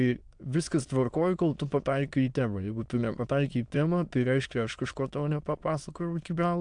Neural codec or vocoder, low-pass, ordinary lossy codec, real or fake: autoencoder, 22.05 kHz, a latent of 192 numbers a frame, VITS, trained on many speakers; 9.9 kHz; AAC, 64 kbps; fake